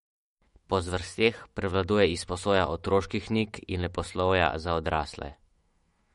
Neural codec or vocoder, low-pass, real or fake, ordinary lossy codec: autoencoder, 48 kHz, 128 numbers a frame, DAC-VAE, trained on Japanese speech; 19.8 kHz; fake; MP3, 48 kbps